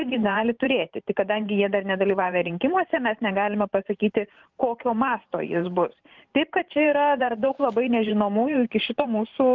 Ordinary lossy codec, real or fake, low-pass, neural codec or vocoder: Opus, 24 kbps; fake; 7.2 kHz; vocoder, 44.1 kHz, 128 mel bands every 512 samples, BigVGAN v2